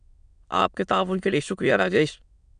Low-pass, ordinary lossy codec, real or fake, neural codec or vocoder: 9.9 kHz; MP3, 96 kbps; fake; autoencoder, 22.05 kHz, a latent of 192 numbers a frame, VITS, trained on many speakers